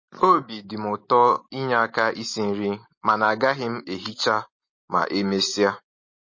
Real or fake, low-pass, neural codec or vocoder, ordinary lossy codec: real; 7.2 kHz; none; MP3, 32 kbps